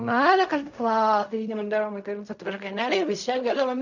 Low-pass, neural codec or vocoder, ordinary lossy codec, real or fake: 7.2 kHz; codec, 16 kHz in and 24 kHz out, 0.4 kbps, LongCat-Audio-Codec, fine tuned four codebook decoder; none; fake